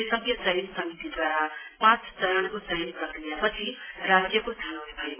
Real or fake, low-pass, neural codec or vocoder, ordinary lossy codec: real; 3.6 kHz; none; AAC, 16 kbps